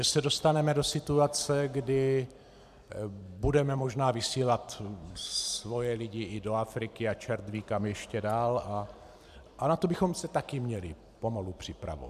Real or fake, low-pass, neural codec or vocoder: fake; 14.4 kHz; vocoder, 44.1 kHz, 128 mel bands every 512 samples, BigVGAN v2